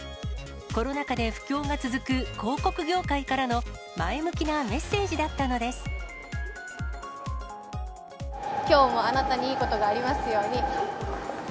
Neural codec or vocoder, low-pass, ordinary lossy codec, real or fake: none; none; none; real